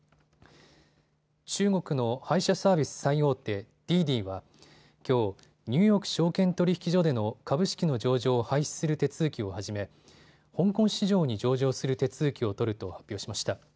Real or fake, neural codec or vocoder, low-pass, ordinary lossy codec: real; none; none; none